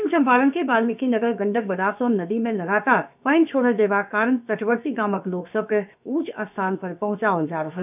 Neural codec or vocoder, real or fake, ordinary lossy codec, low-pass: codec, 16 kHz, about 1 kbps, DyCAST, with the encoder's durations; fake; none; 3.6 kHz